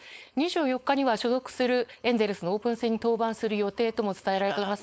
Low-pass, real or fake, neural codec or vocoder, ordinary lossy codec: none; fake; codec, 16 kHz, 4.8 kbps, FACodec; none